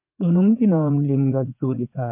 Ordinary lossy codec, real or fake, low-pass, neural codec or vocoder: MP3, 32 kbps; fake; 3.6 kHz; vocoder, 44.1 kHz, 80 mel bands, Vocos